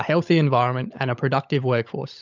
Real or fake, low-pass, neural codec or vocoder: fake; 7.2 kHz; codec, 16 kHz, 16 kbps, FunCodec, trained on LibriTTS, 50 frames a second